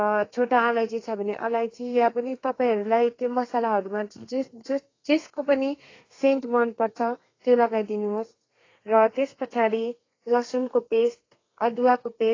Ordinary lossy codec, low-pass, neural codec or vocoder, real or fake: AAC, 32 kbps; 7.2 kHz; codec, 32 kHz, 1.9 kbps, SNAC; fake